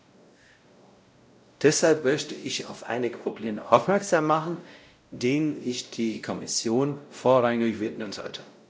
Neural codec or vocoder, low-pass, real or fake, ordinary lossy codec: codec, 16 kHz, 0.5 kbps, X-Codec, WavLM features, trained on Multilingual LibriSpeech; none; fake; none